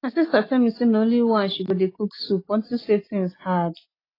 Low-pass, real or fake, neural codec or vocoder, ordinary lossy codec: 5.4 kHz; real; none; AAC, 24 kbps